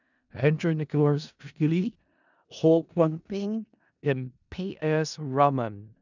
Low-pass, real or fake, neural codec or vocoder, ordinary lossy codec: 7.2 kHz; fake; codec, 16 kHz in and 24 kHz out, 0.4 kbps, LongCat-Audio-Codec, four codebook decoder; none